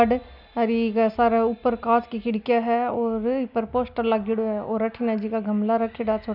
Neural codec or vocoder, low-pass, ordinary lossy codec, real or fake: none; 5.4 kHz; none; real